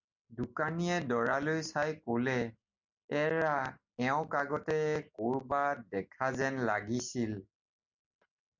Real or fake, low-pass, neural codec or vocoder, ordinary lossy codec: real; 7.2 kHz; none; MP3, 64 kbps